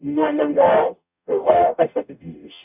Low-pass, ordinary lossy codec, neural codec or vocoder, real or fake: 3.6 kHz; none; codec, 44.1 kHz, 0.9 kbps, DAC; fake